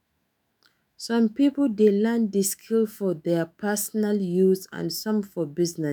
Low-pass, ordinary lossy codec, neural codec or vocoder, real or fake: none; none; autoencoder, 48 kHz, 128 numbers a frame, DAC-VAE, trained on Japanese speech; fake